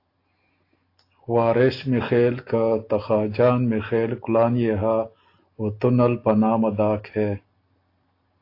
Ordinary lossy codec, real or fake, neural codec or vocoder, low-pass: AAC, 32 kbps; real; none; 5.4 kHz